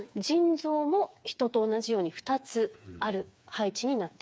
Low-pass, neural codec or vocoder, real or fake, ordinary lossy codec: none; codec, 16 kHz, 4 kbps, FreqCodec, smaller model; fake; none